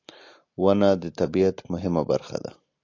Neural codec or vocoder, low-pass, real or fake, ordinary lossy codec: none; 7.2 kHz; real; AAC, 48 kbps